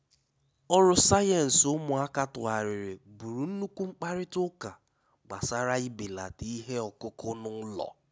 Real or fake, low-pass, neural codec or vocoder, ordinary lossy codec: real; none; none; none